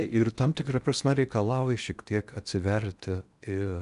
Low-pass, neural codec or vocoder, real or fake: 10.8 kHz; codec, 16 kHz in and 24 kHz out, 0.8 kbps, FocalCodec, streaming, 65536 codes; fake